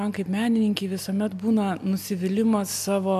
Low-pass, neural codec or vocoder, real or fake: 14.4 kHz; none; real